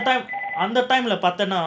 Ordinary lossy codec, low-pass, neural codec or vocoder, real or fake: none; none; none; real